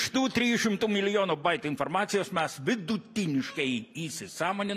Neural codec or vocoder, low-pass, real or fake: none; 14.4 kHz; real